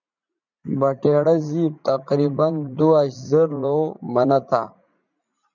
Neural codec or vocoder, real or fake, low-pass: vocoder, 44.1 kHz, 80 mel bands, Vocos; fake; 7.2 kHz